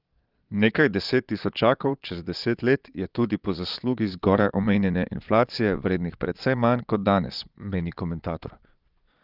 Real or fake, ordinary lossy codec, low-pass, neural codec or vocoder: fake; Opus, 24 kbps; 5.4 kHz; vocoder, 22.05 kHz, 80 mel bands, Vocos